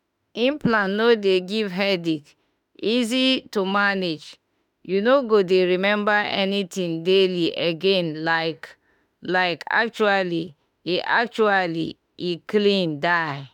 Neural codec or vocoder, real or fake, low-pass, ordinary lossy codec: autoencoder, 48 kHz, 32 numbers a frame, DAC-VAE, trained on Japanese speech; fake; 19.8 kHz; none